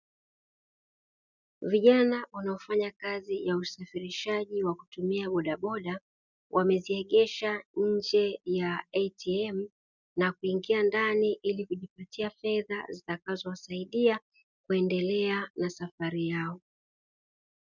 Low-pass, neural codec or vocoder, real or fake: 7.2 kHz; none; real